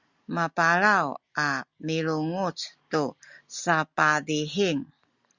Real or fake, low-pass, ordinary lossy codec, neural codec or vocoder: real; 7.2 kHz; Opus, 64 kbps; none